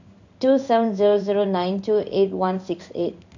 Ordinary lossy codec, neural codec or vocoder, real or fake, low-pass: none; codec, 16 kHz in and 24 kHz out, 1 kbps, XY-Tokenizer; fake; 7.2 kHz